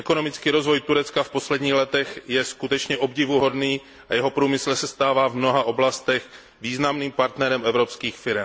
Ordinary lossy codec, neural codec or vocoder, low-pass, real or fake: none; none; none; real